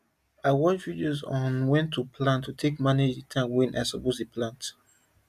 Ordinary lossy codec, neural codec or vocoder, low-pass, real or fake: none; none; 14.4 kHz; real